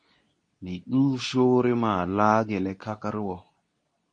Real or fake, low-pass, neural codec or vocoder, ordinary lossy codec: fake; 9.9 kHz; codec, 24 kHz, 0.9 kbps, WavTokenizer, medium speech release version 2; MP3, 48 kbps